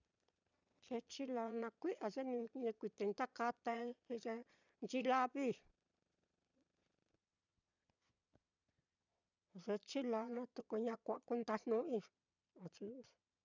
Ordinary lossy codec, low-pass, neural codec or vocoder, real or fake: none; 7.2 kHz; vocoder, 22.05 kHz, 80 mel bands, Vocos; fake